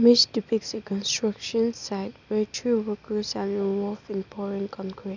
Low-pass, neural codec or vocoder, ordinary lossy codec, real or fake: 7.2 kHz; none; none; real